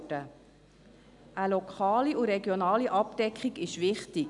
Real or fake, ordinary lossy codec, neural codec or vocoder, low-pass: real; MP3, 96 kbps; none; 10.8 kHz